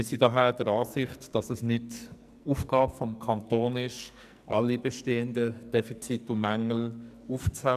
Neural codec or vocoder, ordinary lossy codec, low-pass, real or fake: codec, 44.1 kHz, 2.6 kbps, SNAC; none; 14.4 kHz; fake